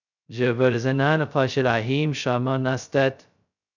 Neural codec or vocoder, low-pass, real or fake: codec, 16 kHz, 0.2 kbps, FocalCodec; 7.2 kHz; fake